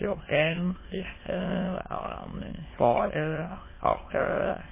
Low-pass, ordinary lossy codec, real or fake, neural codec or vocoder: 3.6 kHz; MP3, 16 kbps; fake; autoencoder, 22.05 kHz, a latent of 192 numbers a frame, VITS, trained on many speakers